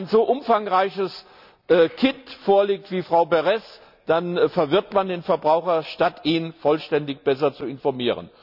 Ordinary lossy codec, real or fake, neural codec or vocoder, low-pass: none; real; none; 5.4 kHz